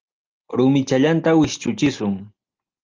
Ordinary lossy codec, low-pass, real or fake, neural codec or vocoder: Opus, 24 kbps; 7.2 kHz; real; none